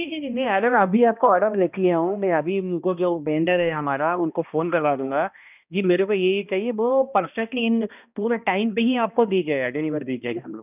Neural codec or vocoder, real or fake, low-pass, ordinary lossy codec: codec, 16 kHz, 1 kbps, X-Codec, HuBERT features, trained on balanced general audio; fake; 3.6 kHz; none